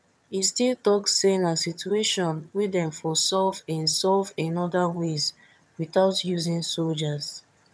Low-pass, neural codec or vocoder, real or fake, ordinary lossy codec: none; vocoder, 22.05 kHz, 80 mel bands, HiFi-GAN; fake; none